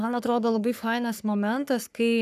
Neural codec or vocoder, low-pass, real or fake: codec, 44.1 kHz, 3.4 kbps, Pupu-Codec; 14.4 kHz; fake